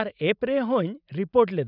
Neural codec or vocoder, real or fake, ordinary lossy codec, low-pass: none; real; none; 5.4 kHz